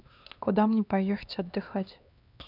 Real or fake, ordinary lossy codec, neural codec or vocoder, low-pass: fake; none; codec, 16 kHz, 2 kbps, X-Codec, HuBERT features, trained on LibriSpeech; 5.4 kHz